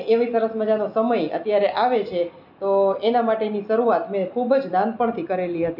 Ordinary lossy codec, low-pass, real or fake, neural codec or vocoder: none; 5.4 kHz; real; none